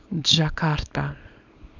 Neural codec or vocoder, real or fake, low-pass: codec, 24 kHz, 0.9 kbps, WavTokenizer, small release; fake; 7.2 kHz